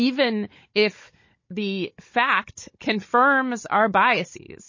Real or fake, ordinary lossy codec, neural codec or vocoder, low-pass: fake; MP3, 32 kbps; codec, 16 kHz, 16 kbps, FunCodec, trained on Chinese and English, 50 frames a second; 7.2 kHz